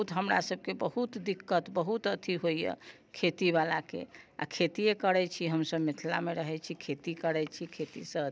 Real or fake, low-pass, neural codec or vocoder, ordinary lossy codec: real; none; none; none